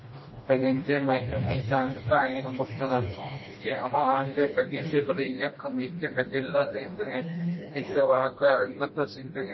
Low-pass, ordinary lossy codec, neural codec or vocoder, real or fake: 7.2 kHz; MP3, 24 kbps; codec, 16 kHz, 1 kbps, FreqCodec, smaller model; fake